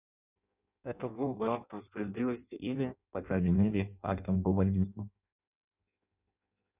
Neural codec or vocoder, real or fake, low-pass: codec, 16 kHz in and 24 kHz out, 0.6 kbps, FireRedTTS-2 codec; fake; 3.6 kHz